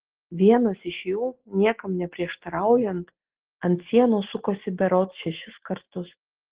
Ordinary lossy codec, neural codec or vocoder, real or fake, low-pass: Opus, 16 kbps; none; real; 3.6 kHz